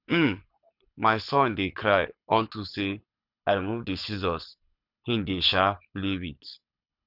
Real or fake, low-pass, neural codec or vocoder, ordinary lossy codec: fake; 5.4 kHz; codec, 24 kHz, 6 kbps, HILCodec; none